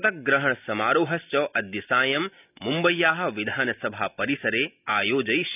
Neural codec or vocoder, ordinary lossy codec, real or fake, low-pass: none; none; real; 3.6 kHz